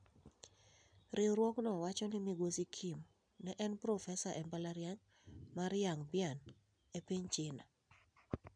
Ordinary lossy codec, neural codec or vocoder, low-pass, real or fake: none; none; 9.9 kHz; real